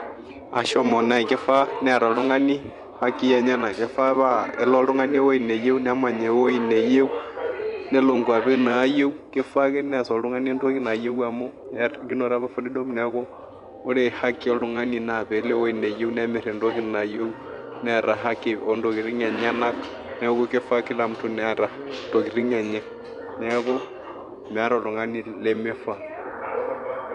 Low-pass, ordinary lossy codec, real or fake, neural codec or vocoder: 10.8 kHz; none; fake; vocoder, 24 kHz, 100 mel bands, Vocos